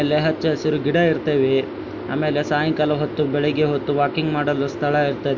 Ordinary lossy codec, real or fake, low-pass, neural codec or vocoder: none; real; 7.2 kHz; none